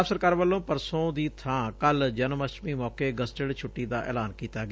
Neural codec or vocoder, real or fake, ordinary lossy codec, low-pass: none; real; none; none